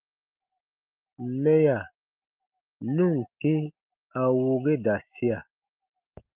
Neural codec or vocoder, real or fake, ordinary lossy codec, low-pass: none; real; Opus, 32 kbps; 3.6 kHz